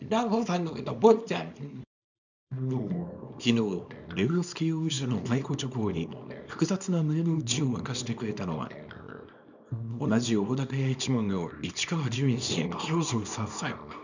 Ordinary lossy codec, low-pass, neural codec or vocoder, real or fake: none; 7.2 kHz; codec, 24 kHz, 0.9 kbps, WavTokenizer, small release; fake